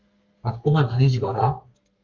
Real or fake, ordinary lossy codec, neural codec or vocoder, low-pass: fake; Opus, 32 kbps; codec, 32 kHz, 1.9 kbps, SNAC; 7.2 kHz